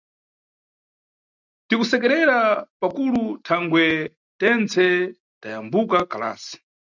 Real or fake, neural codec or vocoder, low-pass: real; none; 7.2 kHz